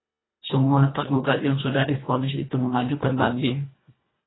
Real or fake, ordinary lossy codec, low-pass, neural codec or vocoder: fake; AAC, 16 kbps; 7.2 kHz; codec, 24 kHz, 1.5 kbps, HILCodec